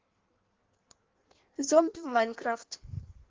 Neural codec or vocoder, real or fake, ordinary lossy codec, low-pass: codec, 16 kHz in and 24 kHz out, 1.1 kbps, FireRedTTS-2 codec; fake; Opus, 16 kbps; 7.2 kHz